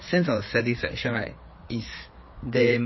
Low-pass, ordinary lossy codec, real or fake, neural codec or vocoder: 7.2 kHz; MP3, 24 kbps; fake; codec, 16 kHz, 4 kbps, FreqCodec, larger model